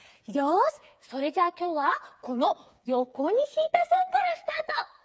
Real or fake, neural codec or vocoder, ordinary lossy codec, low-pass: fake; codec, 16 kHz, 4 kbps, FreqCodec, smaller model; none; none